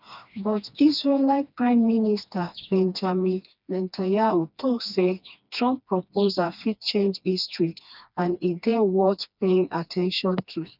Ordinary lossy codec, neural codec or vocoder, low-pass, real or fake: none; codec, 16 kHz, 2 kbps, FreqCodec, smaller model; 5.4 kHz; fake